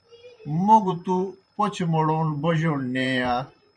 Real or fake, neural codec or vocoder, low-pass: fake; vocoder, 44.1 kHz, 128 mel bands every 512 samples, BigVGAN v2; 9.9 kHz